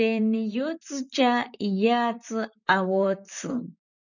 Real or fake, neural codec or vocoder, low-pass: fake; vocoder, 44.1 kHz, 128 mel bands, Pupu-Vocoder; 7.2 kHz